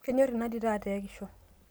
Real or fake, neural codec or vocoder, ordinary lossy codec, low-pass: real; none; none; none